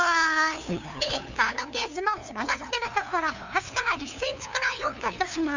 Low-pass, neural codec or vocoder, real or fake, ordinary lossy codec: 7.2 kHz; codec, 16 kHz, 2 kbps, FunCodec, trained on LibriTTS, 25 frames a second; fake; none